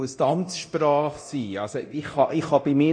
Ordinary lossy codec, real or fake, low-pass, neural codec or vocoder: none; fake; 9.9 kHz; codec, 24 kHz, 0.9 kbps, DualCodec